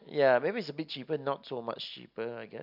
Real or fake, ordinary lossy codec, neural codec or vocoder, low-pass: real; MP3, 48 kbps; none; 5.4 kHz